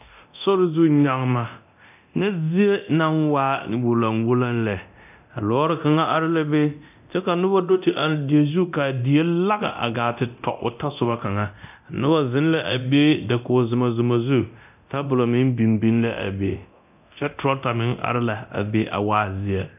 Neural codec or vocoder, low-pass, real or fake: codec, 24 kHz, 0.9 kbps, DualCodec; 3.6 kHz; fake